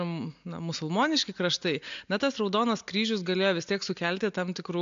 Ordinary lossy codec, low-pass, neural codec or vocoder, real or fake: AAC, 64 kbps; 7.2 kHz; none; real